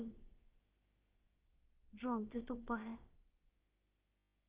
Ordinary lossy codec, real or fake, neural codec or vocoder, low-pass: Opus, 16 kbps; fake; codec, 16 kHz, about 1 kbps, DyCAST, with the encoder's durations; 3.6 kHz